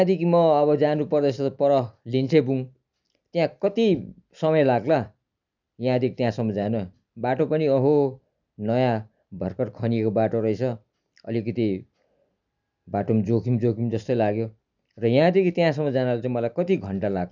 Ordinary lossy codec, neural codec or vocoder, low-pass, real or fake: none; none; 7.2 kHz; real